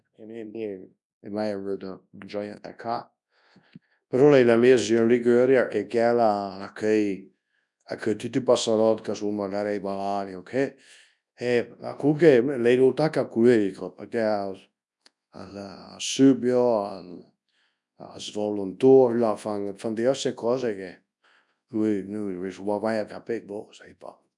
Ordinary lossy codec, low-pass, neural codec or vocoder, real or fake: none; 10.8 kHz; codec, 24 kHz, 0.9 kbps, WavTokenizer, large speech release; fake